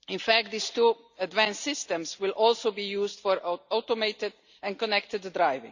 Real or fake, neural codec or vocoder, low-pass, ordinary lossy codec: real; none; 7.2 kHz; Opus, 64 kbps